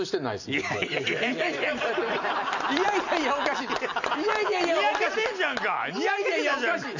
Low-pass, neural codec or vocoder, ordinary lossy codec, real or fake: 7.2 kHz; none; none; real